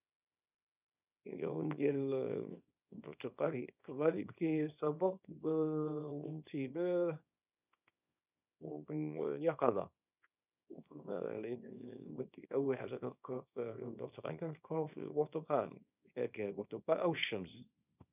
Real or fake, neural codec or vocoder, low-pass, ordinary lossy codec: fake; codec, 24 kHz, 0.9 kbps, WavTokenizer, small release; 3.6 kHz; none